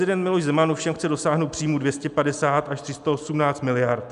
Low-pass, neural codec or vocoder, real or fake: 10.8 kHz; none; real